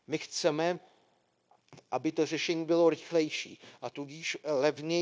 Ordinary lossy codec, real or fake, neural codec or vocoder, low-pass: none; fake; codec, 16 kHz, 0.9 kbps, LongCat-Audio-Codec; none